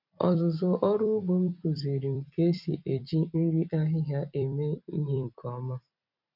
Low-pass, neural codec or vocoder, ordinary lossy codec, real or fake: 5.4 kHz; vocoder, 44.1 kHz, 128 mel bands every 256 samples, BigVGAN v2; none; fake